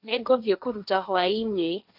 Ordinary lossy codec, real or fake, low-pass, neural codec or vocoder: none; fake; 5.4 kHz; codec, 16 kHz, 1.1 kbps, Voila-Tokenizer